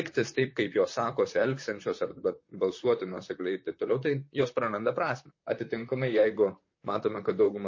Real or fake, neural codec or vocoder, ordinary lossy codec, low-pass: fake; vocoder, 44.1 kHz, 128 mel bands, Pupu-Vocoder; MP3, 32 kbps; 7.2 kHz